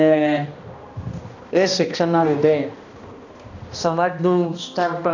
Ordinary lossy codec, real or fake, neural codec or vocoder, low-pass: none; fake; codec, 16 kHz, 1 kbps, X-Codec, HuBERT features, trained on balanced general audio; 7.2 kHz